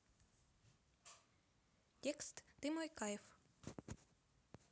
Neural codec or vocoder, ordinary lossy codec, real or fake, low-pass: none; none; real; none